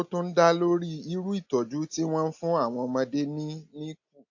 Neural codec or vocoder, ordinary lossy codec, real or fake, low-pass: none; AAC, 48 kbps; real; 7.2 kHz